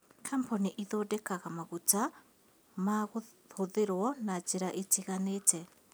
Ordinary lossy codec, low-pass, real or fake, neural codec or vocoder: none; none; real; none